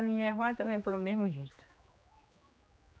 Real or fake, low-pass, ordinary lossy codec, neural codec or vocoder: fake; none; none; codec, 16 kHz, 2 kbps, X-Codec, HuBERT features, trained on general audio